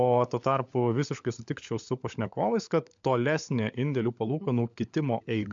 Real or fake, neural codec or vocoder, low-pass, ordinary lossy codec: fake; codec, 16 kHz, 16 kbps, FunCodec, trained on LibriTTS, 50 frames a second; 7.2 kHz; MP3, 64 kbps